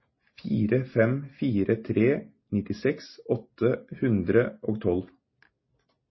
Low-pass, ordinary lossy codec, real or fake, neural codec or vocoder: 7.2 kHz; MP3, 24 kbps; real; none